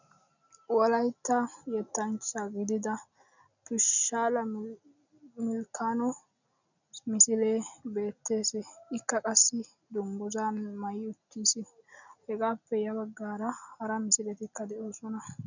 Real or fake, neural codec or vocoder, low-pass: real; none; 7.2 kHz